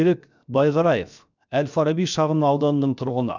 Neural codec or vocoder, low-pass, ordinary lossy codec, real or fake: codec, 16 kHz, 0.7 kbps, FocalCodec; 7.2 kHz; none; fake